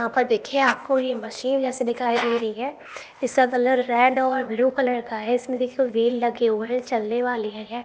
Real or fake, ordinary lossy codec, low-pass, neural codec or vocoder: fake; none; none; codec, 16 kHz, 0.8 kbps, ZipCodec